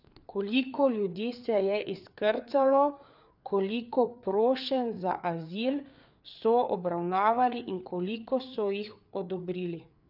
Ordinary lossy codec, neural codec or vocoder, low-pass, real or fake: none; codec, 16 kHz, 4 kbps, FreqCodec, larger model; 5.4 kHz; fake